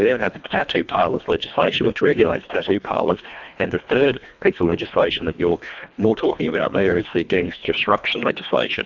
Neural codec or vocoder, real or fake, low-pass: codec, 24 kHz, 1.5 kbps, HILCodec; fake; 7.2 kHz